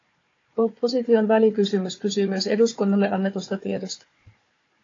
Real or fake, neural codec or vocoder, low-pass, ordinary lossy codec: fake; codec, 16 kHz, 4 kbps, FunCodec, trained on Chinese and English, 50 frames a second; 7.2 kHz; AAC, 32 kbps